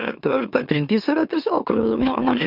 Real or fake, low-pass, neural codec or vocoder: fake; 5.4 kHz; autoencoder, 44.1 kHz, a latent of 192 numbers a frame, MeloTTS